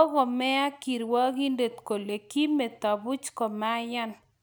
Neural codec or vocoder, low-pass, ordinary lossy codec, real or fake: none; none; none; real